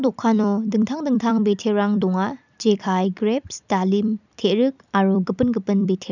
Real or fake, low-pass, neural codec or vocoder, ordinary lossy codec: fake; 7.2 kHz; vocoder, 44.1 kHz, 128 mel bands every 256 samples, BigVGAN v2; none